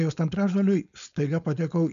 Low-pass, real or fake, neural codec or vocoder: 7.2 kHz; fake; codec, 16 kHz, 4.8 kbps, FACodec